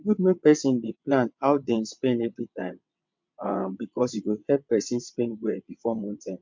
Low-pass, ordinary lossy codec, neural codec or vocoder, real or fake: 7.2 kHz; MP3, 64 kbps; vocoder, 22.05 kHz, 80 mel bands, WaveNeXt; fake